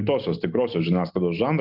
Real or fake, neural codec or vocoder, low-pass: real; none; 5.4 kHz